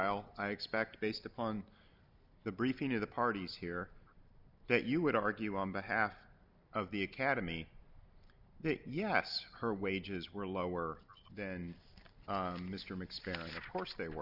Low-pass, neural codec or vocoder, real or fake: 5.4 kHz; none; real